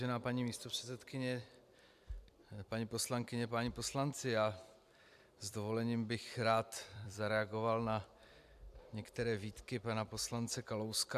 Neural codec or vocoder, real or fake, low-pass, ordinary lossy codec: vocoder, 44.1 kHz, 128 mel bands every 256 samples, BigVGAN v2; fake; 14.4 kHz; AAC, 96 kbps